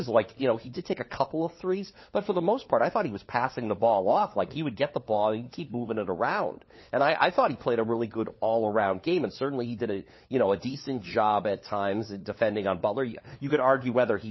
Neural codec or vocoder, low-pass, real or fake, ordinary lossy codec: codec, 16 kHz, 4 kbps, FunCodec, trained on LibriTTS, 50 frames a second; 7.2 kHz; fake; MP3, 24 kbps